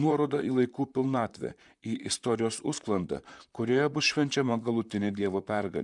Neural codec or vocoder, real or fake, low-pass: vocoder, 44.1 kHz, 128 mel bands, Pupu-Vocoder; fake; 10.8 kHz